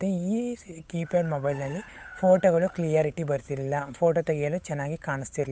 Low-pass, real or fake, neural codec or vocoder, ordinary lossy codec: none; fake; codec, 16 kHz, 8 kbps, FunCodec, trained on Chinese and English, 25 frames a second; none